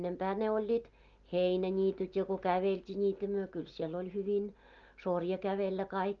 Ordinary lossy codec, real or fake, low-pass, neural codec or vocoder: Opus, 32 kbps; real; 7.2 kHz; none